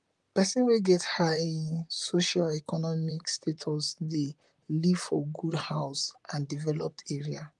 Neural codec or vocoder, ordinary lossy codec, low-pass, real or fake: vocoder, 44.1 kHz, 128 mel bands, Pupu-Vocoder; Opus, 32 kbps; 10.8 kHz; fake